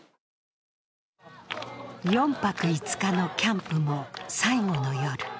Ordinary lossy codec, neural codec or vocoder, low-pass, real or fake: none; none; none; real